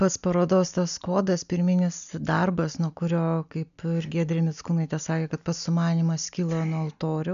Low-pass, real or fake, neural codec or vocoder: 7.2 kHz; real; none